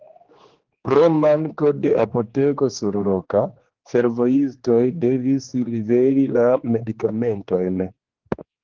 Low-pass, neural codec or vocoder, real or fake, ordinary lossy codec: 7.2 kHz; codec, 16 kHz, 2 kbps, X-Codec, HuBERT features, trained on general audio; fake; Opus, 16 kbps